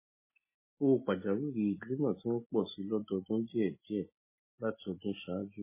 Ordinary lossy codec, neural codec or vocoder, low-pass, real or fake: MP3, 16 kbps; none; 3.6 kHz; real